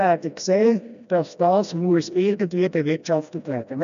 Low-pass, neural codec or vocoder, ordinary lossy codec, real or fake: 7.2 kHz; codec, 16 kHz, 1 kbps, FreqCodec, smaller model; none; fake